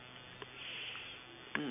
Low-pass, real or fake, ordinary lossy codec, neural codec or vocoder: 3.6 kHz; real; none; none